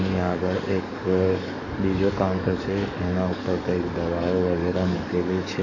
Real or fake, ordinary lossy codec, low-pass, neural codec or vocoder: fake; none; 7.2 kHz; codec, 44.1 kHz, 7.8 kbps, DAC